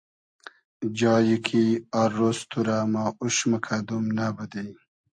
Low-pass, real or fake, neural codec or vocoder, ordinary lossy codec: 9.9 kHz; real; none; MP3, 48 kbps